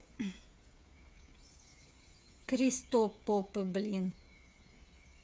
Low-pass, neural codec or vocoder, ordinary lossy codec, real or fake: none; codec, 16 kHz, 8 kbps, FreqCodec, smaller model; none; fake